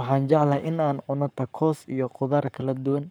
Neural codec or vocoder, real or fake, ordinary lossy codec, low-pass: codec, 44.1 kHz, 7.8 kbps, Pupu-Codec; fake; none; none